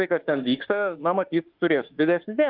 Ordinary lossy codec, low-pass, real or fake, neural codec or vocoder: Opus, 24 kbps; 5.4 kHz; fake; autoencoder, 48 kHz, 32 numbers a frame, DAC-VAE, trained on Japanese speech